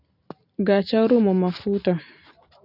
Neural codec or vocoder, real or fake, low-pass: vocoder, 44.1 kHz, 128 mel bands every 512 samples, BigVGAN v2; fake; 5.4 kHz